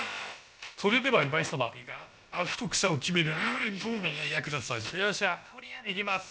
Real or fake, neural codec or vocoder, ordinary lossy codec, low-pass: fake; codec, 16 kHz, about 1 kbps, DyCAST, with the encoder's durations; none; none